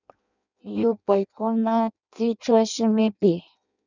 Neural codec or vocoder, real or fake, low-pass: codec, 16 kHz in and 24 kHz out, 0.6 kbps, FireRedTTS-2 codec; fake; 7.2 kHz